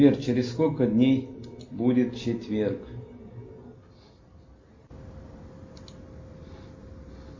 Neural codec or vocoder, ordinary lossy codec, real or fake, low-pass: none; MP3, 32 kbps; real; 7.2 kHz